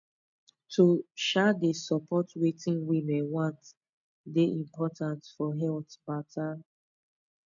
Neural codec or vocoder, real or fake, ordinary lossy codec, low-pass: none; real; none; 7.2 kHz